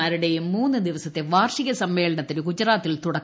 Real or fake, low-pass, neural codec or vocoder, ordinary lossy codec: real; none; none; none